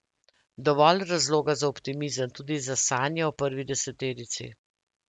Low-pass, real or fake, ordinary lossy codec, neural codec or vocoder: 10.8 kHz; real; MP3, 96 kbps; none